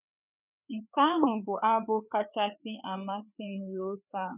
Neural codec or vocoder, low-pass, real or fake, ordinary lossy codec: codec, 16 kHz, 4 kbps, FreqCodec, larger model; 3.6 kHz; fake; none